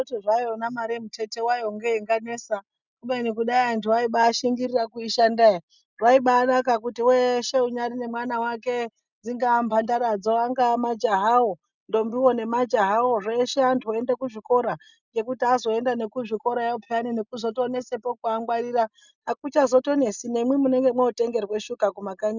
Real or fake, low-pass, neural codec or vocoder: real; 7.2 kHz; none